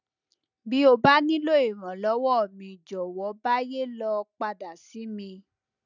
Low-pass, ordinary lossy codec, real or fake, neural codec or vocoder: 7.2 kHz; none; real; none